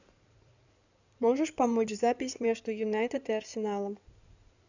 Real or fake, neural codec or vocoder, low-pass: fake; codec, 16 kHz in and 24 kHz out, 2.2 kbps, FireRedTTS-2 codec; 7.2 kHz